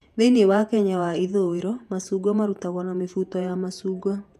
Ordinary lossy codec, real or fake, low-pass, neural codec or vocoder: none; fake; 14.4 kHz; vocoder, 48 kHz, 128 mel bands, Vocos